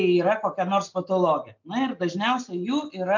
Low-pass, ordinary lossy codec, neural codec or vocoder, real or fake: 7.2 kHz; MP3, 64 kbps; none; real